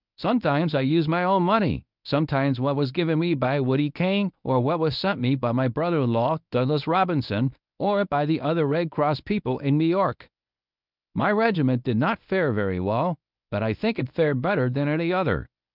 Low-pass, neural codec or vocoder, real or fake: 5.4 kHz; codec, 24 kHz, 0.9 kbps, WavTokenizer, medium speech release version 1; fake